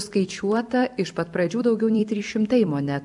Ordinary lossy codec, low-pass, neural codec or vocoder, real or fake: MP3, 96 kbps; 10.8 kHz; vocoder, 44.1 kHz, 128 mel bands every 256 samples, BigVGAN v2; fake